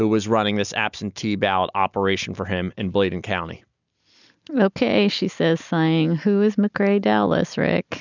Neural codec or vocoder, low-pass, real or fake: none; 7.2 kHz; real